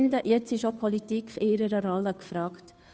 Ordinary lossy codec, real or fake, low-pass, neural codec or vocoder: none; fake; none; codec, 16 kHz, 2 kbps, FunCodec, trained on Chinese and English, 25 frames a second